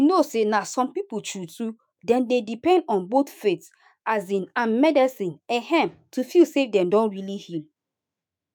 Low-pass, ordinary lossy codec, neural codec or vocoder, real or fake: none; none; autoencoder, 48 kHz, 128 numbers a frame, DAC-VAE, trained on Japanese speech; fake